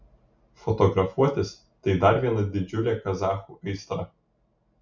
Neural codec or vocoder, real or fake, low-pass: none; real; 7.2 kHz